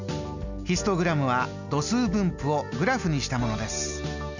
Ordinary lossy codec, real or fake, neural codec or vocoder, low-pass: none; real; none; 7.2 kHz